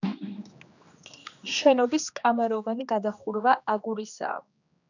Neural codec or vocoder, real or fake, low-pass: codec, 16 kHz, 2 kbps, X-Codec, HuBERT features, trained on general audio; fake; 7.2 kHz